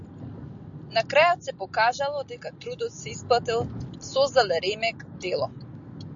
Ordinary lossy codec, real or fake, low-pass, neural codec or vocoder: AAC, 64 kbps; real; 7.2 kHz; none